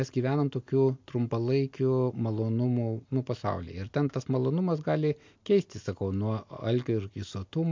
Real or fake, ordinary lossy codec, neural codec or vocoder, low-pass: real; MP3, 48 kbps; none; 7.2 kHz